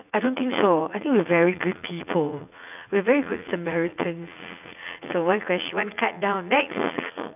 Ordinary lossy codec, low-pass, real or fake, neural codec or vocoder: none; 3.6 kHz; fake; vocoder, 44.1 kHz, 80 mel bands, Vocos